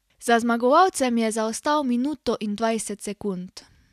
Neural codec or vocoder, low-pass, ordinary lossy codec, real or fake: none; 14.4 kHz; none; real